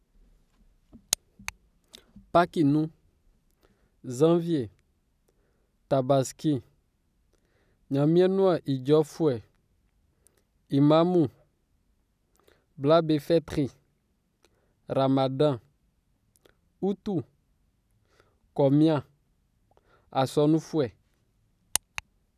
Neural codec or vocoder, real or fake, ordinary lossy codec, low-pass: none; real; none; 14.4 kHz